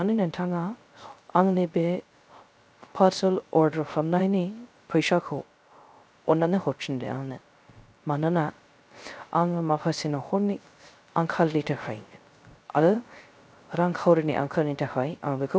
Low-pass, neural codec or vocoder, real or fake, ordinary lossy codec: none; codec, 16 kHz, 0.3 kbps, FocalCodec; fake; none